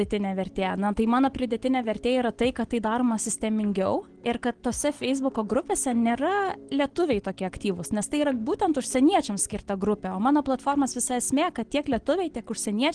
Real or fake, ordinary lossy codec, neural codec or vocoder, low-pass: real; Opus, 16 kbps; none; 9.9 kHz